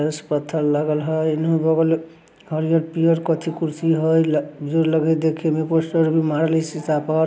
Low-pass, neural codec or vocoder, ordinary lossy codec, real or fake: none; none; none; real